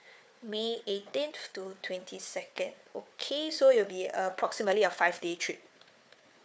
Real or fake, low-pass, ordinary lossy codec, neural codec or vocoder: fake; none; none; codec, 16 kHz, 4 kbps, FunCodec, trained on Chinese and English, 50 frames a second